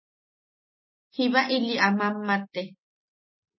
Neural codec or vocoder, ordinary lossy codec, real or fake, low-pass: none; MP3, 24 kbps; real; 7.2 kHz